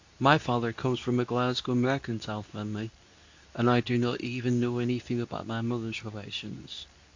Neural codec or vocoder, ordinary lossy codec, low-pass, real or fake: codec, 24 kHz, 0.9 kbps, WavTokenizer, medium speech release version 2; AAC, 48 kbps; 7.2 kHz; fake